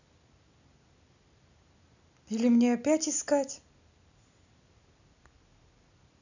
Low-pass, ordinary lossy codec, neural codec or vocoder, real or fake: 7.2 kHz; none; none; real